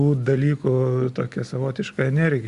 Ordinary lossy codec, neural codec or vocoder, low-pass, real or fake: Opus, 64 kbps; none; 10.8 kHz; real